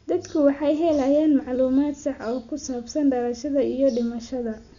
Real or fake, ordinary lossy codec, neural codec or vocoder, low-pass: real; none; none; 7.2 kHz